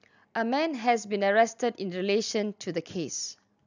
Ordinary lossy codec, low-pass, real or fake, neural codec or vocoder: none; 7.2 kHz; real; none